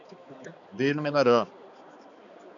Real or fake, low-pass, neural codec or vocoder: fake; 7.2 kHz; codec, 16 kHz, 4 kbps, X-Codec, HuBERT features, trained on balanced general audio